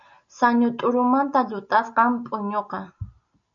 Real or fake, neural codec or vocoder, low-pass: real; none; 7.2 kHz